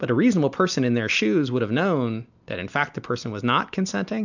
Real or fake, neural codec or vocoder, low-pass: real; none; 7.2 kHz